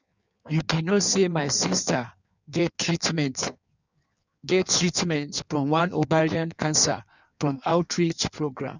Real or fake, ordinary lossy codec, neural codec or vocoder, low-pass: fake; none; codec, 16 kHz in and 24 kHz out, 1.1 kbps, FireRedTTS-2 codec; 7.2 kHz